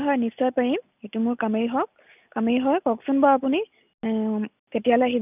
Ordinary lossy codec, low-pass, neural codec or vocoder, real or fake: none; 3.6 kHz; none; real